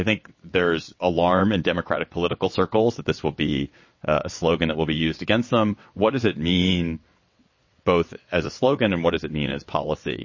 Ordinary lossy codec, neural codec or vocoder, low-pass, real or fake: MP3, 32 kbps; vocoder, 22.05 kHz, 80 mel bands, WaveNeXt; 7.2 kHz; fake